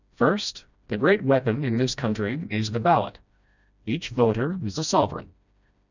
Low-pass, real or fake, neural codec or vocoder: 7.2 kHz; fake; codec, 16 kHz, 1 kbps, FreqCodec, smaller model